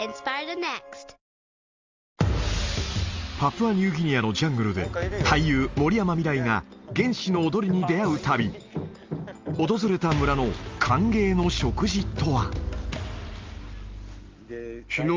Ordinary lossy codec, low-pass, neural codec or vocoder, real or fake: Opus, 32 kbps; 7.2 kHz; none; real